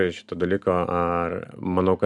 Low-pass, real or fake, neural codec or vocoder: 10.8 kHz; real; none